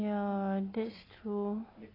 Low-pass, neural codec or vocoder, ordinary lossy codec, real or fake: 5.4 kHz; none; AAC, 24 kbps; real